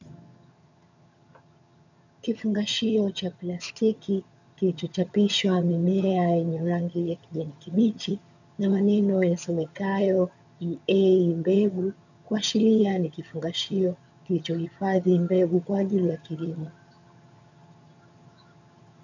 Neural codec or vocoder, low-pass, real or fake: vocoder, 22.05 kHz, 80 mel bands, HiFi-GAN; 7.2 kHz; fake